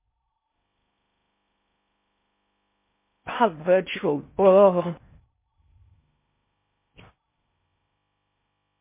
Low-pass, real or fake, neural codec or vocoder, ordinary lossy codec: 3.6 kHz; fake; codec, 16 kHz in and 24 kHz out, 0.6 kbps, FocalCodec, streaming, 4096 codes; MP3, 24 kbps